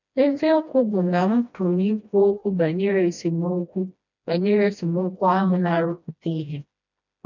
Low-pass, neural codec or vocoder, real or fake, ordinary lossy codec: 7.2 kHz; codec, 16 kHz, 1 kbps, FreqCodec, smaller model; fake; none